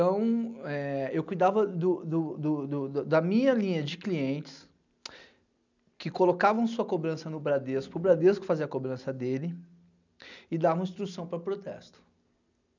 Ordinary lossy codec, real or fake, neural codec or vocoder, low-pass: none; real; none; 7.2 kHz